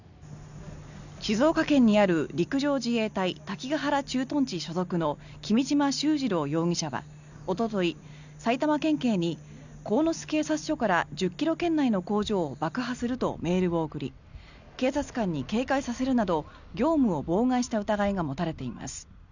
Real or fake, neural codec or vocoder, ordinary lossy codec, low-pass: real; none; none; 7.2 kHz